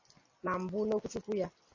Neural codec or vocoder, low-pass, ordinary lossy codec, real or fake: none; 7.2 kHz; MP3, 32 kbps; real